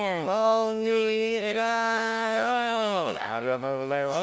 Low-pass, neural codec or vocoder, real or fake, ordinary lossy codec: none; codec, 16 kHz, 0.5 kbps, FunCodec, trained on LibriTTS, 25 frames a second; fake; none